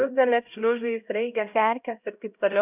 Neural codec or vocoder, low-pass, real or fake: codec, 16 kHz, 0.5 kbps, X-Codec, HuBERT features, trained on LibriSpeech; 3.6 kHz; fake